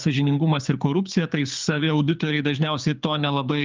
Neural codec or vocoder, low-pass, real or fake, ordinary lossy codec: codec, 24 kHz, 6 kbps, HILCodec; 7.2 kHz; fake; Opus, 24 kbps